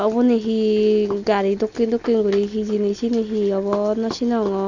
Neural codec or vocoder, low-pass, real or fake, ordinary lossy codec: none; 7.2 kHz; real; none